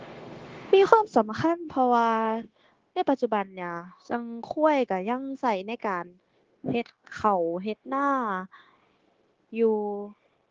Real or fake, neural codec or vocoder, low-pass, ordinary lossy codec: fake; codec, 16 kHz, 0.9 kbps, LongCat-Audio-Codec; 7.2 kHz; Opus, 16 kbps